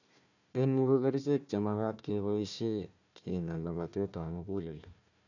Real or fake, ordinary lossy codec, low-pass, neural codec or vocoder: fake; none; 7.2 kHz; codec, 16 kHz, 1 kbps, FunCodec, trained on Chinese and English, 50 frames a second